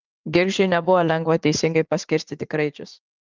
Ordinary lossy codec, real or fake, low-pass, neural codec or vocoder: Opus, 32 kbps; fake; 7.2 kHz; vocoder, 44.1 kHz, 80 mel bands, Vocos